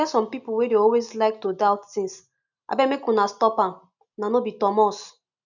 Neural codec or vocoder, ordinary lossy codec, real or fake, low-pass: none; none; real; 7.2 kHz